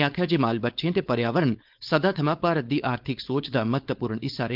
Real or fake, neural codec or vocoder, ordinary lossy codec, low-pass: fake; codec, 16 kHz, 4.8 kbps, FACodec; Opus, 32 kbps; 5.4 kHz